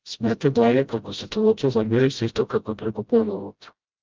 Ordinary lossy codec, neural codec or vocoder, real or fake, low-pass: Opus, 24 kbps; codec, 16 kHz, 0.5 kbps, FreqCodec, smaller model; fake; 7.2 kHz